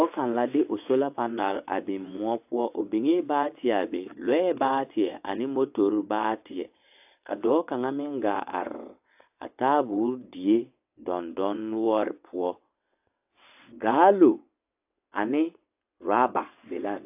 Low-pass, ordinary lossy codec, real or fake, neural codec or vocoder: 3.6 kHz; AAC, 32 kbps; fake; vocoder, 22.05 kHz, 80 mel bands, WaveNeXt